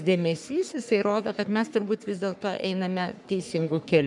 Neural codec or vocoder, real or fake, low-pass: codec, 44.1 kHz, 3.4 kbps, Pupu-Codec; fake; 10.8 kHz